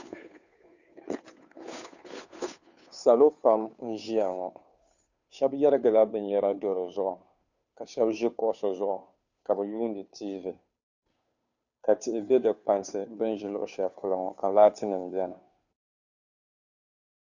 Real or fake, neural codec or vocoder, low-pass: fake; codec, 16 kHz, 2 kbps, FunCodec, trained on Chinese and English, 25 frames a second; 7.2 kHz